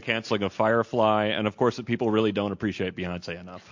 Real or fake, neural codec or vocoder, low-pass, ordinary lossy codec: real; none; 7.2 kHz; MP3, 48 kbps